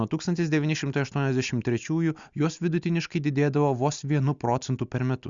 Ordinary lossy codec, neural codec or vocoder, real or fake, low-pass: Opus, 64 kbps; none; real; 7.2 kHz